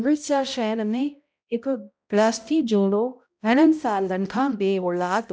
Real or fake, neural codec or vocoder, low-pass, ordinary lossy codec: fake; codec, 16 kHz, 0.5 kbps, X-Codec, HuBERT features, trained on balanced general audio; none; none